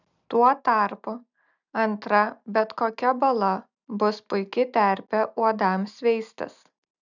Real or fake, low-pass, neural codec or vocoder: real; 7.2 kHz; none